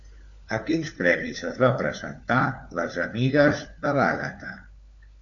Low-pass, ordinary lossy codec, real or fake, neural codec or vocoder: 7.2 kHz; AAC, 64 kbps; fake; codec, 16 kHz, 4 kbps, FunCodec, trained on LibriTTS, 50 frames a second